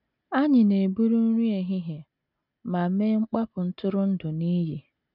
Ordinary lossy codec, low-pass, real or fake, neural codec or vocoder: none; 5.4 kHz; real; none